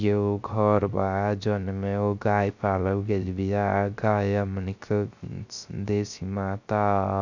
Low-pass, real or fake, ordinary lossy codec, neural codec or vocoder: 7.2 kHz; fake; none; codec, 16 kHz, 0.3 kbps, FocalCodec